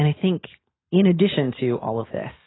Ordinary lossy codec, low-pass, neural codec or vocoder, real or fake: AAC, 16 kbps; 7.2 kHz; none; real